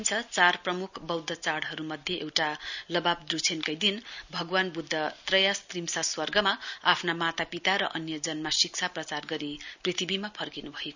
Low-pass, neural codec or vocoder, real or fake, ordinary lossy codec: 7.2 kHz; none; real; none